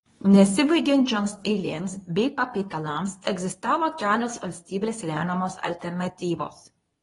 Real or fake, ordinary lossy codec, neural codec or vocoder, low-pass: fake; AAC, 32 kbps; codec, 24 kHz, 0.9 kbps, WavTokenizer, medium speech release version 2; 10.8 kHz